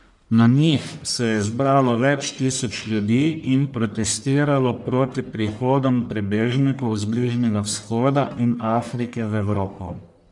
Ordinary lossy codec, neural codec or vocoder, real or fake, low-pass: none; codec, 44.1 kHz, 1.7 kbps, Pupu-Codec; fake; 10.8 kHz